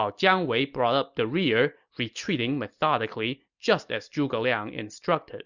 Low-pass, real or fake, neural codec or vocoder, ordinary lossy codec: 7.2 kHz; real; none; Opus, 64 kbps